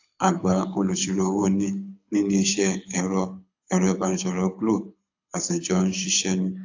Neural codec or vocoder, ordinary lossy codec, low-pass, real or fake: codec, 24 kHz, 6 kbps, HILCodec; AAC, 48 kbps; 7.2 kHz; fake